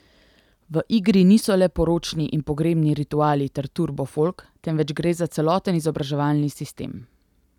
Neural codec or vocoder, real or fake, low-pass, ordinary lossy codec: none; real; 19.8 kHz; none